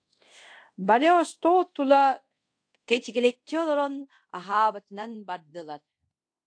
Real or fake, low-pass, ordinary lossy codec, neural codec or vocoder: fake; 9.9 kHz; AAC, 48 kbps; codec, 24 kHz, 0.5 kbps, DualCodec